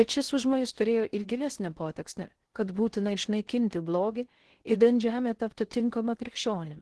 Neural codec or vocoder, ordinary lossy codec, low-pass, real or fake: codec, 16 kHz in and 24 kHz out, 0.6 kbps, FocalCodec, streaming, 2048 codes; Opus, 16 kbps; 10.8 kHz; fake